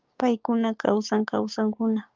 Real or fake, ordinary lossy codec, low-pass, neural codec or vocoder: fake; Opus, 24 kbps; 7.2 kHz; codec, 16 kHz, 6 kbps, DAC